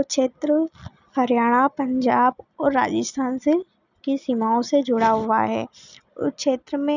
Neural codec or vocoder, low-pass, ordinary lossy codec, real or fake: none; 7.2 kHz; none; real